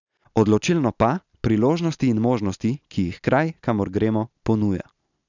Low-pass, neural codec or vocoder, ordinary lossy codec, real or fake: 7.2 kHz; none; none; real